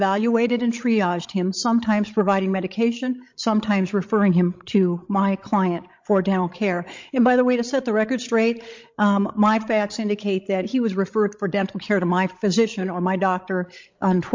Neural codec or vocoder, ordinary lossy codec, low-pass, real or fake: codec, 16 kHz, 16 kbps, FreqCodec, larger model; MP3, 48 kbps; 7.2 kHz; fake